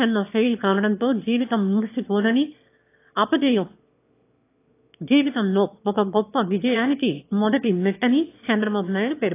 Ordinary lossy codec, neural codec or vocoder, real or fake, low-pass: AAC, 24 kbps; autoencoder, 22.05 kHz, a latent of 192 numbers a frame, VITS, trained on one speaker; fake; 3.6 kHz